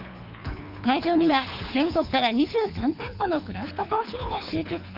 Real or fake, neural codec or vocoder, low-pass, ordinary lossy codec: fake; codec, 24 kHz, 3 kbps, HILCodec; 5.4 kHz; none